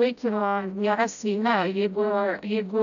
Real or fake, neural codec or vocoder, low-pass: fake; codec, 16 kHz, 0.5 kbps, FreqCodec, smaller model; 7.2 kHz